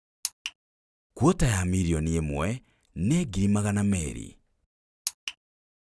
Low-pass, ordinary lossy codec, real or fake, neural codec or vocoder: none; none; real; none